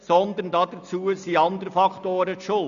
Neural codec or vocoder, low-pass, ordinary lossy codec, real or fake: none; 7.2 kHz; none; real